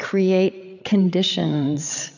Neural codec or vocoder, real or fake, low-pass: codec, 16 kHz, 8 kbps, FreqCodec, larger model; fake; 7.2 kHz